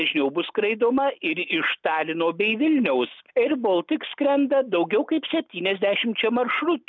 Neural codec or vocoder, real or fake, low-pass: none; real; 7.2 kHz